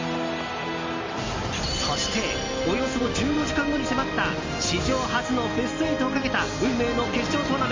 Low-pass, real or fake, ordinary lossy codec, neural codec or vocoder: 7.2 kHz; real; MP3, 64 kbps; none